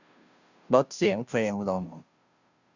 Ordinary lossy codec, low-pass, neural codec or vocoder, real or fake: Opus, 64 kbps; 7.2 kHz; codec, 16 kHz, 0.5 kbps, FunCodec, trained on Chinese and English, 25 frames a second; fake